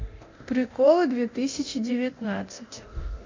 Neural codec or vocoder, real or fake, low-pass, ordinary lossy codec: codec, 24 kHz, 0.9 kbps, DualCodec; fake; 7.2 kHz; MP3, 48 kbps